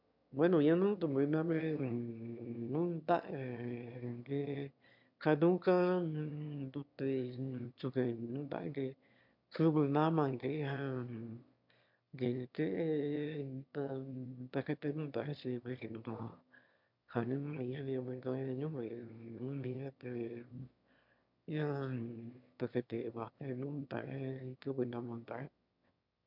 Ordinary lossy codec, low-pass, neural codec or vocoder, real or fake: MP3, 48 kbps; 5.4 kHz; autoencoder, 22.05 kHz, a latent of 192 numbers a frame, VITS, trained on one speaker; fake